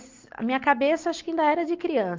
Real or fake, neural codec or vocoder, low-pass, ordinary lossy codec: real; none; 7.2 kHz; Opus, 24 kbps